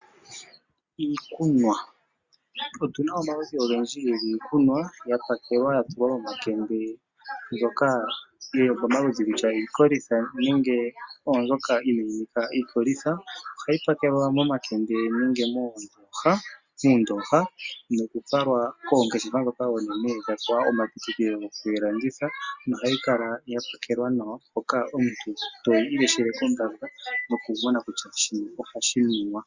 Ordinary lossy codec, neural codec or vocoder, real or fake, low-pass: Opus, 64 kbps; none; real; 7.2 kHz